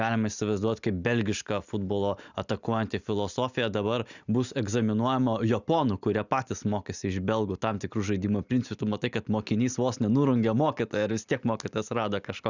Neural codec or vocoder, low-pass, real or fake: none; 7.2 kHz; real